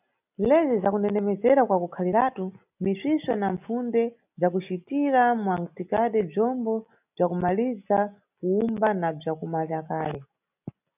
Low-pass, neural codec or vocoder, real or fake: 3.6 kHz; none; real